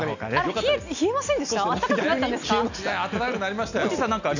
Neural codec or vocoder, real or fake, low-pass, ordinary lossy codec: none; real; 7.2 kHz; none